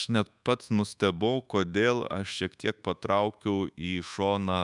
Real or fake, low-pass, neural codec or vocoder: fake; 10.8 kHz; codec, 24 kHz, 1.2 kbps, DualCodec